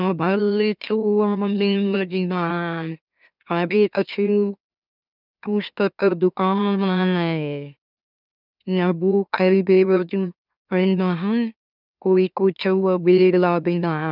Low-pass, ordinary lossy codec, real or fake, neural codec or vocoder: 5.4 kHz; none; fake; autoencoder, 44.1 kHz, a latent of 192 numbers a frame, MeloTTS